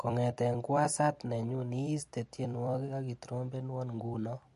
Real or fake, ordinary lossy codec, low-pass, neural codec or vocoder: fake; MP3, 48 kbps; 14.4 kHz; vocoder, 44.1 kHz, 128 mel bands every 256 samples, BigVGAN v2